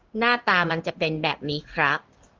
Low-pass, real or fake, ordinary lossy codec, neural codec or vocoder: 7.2 kHz; fake; Opus, 24 kbps; codec, 16 kHz in and 24 kHz out, 1 kbps, XY-Tokenizer